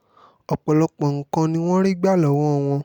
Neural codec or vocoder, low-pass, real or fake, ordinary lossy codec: none; 19.8 kHz; real; none